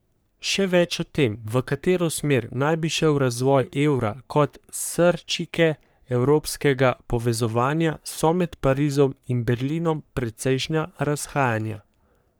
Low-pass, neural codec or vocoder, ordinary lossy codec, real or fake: none; codec, 44.1 kHz, 3.4 kbps, Pupu-Codec; none; fake